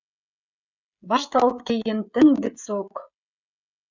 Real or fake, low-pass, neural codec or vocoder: fake; 7.2 kHz; codec, 16 kHz, 16 kbps, FreqCodec, smaller model